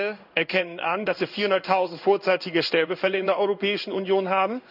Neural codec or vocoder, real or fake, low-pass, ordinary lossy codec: codec, 16 kHz in and 24 kHz out, 1 kbps, XY-Tokenizer; fake; 5.4 kHz; none